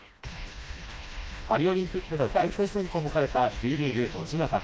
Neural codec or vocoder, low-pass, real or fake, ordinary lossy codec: codec, 16 kHz, 1 kbps, FreqCodec, smaller model; none; fake; none